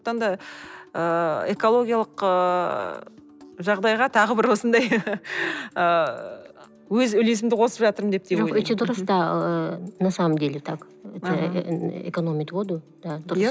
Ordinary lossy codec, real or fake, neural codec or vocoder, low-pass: none; real; none; none